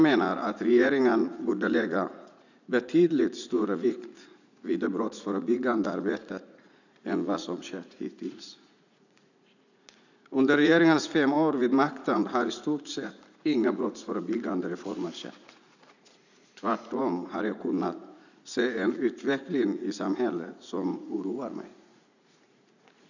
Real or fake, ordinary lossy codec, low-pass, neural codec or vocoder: fake; none; 7.2 kHz; vocoder, 44.1 kHz, 80 mel bands, Vocos